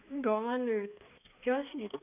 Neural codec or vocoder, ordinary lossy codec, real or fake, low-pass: codec, 16 kHz, 2 kbps, X-Codec, HuBERT features, trained on balanced general audio; none; fake; 3.6 kHz